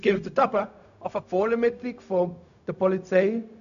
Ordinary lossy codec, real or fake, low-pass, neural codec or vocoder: none; fake; 7.2 kHz; codec, 16 kHz, 0.4 kbps, LongCat-Audio-Codec